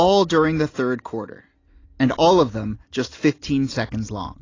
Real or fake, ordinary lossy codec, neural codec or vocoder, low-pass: real; AAC, 32 kbps; none; 7.2 kHz